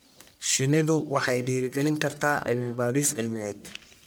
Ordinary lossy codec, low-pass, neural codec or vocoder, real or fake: none; none; codec, 44.1 kHz, 1.7 kbps, Pupu-Codec; fake